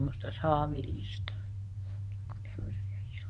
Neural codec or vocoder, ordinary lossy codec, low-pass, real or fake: codec, 24 kHz, 0.9 kbps, WavTokenizer, medium speech release version 1; none; 10.8 kHz; fake